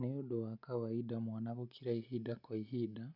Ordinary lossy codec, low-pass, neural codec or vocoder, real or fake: none; 5.4 kHz; none; real